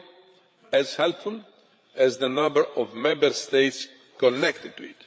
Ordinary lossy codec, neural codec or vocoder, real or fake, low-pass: none; codec, 16 kHz, 8 kbps, FreqCodec, larger model; fake; none